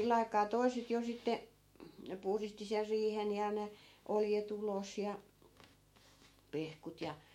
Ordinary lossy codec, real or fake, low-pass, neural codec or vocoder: MP3, 64 kbps; fake; 19.8 kHz; autoencoder, 48 kHz, 128 numbers a frame, DAC-VAE, trained on Japanese speech